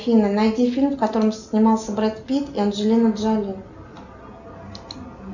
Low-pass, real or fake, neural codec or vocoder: 7.2 kHz; real; none